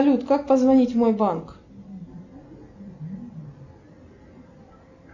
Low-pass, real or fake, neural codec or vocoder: 7.2 kHz; real; none